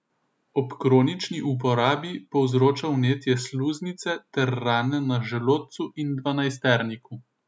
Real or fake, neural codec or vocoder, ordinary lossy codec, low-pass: real; none; none; none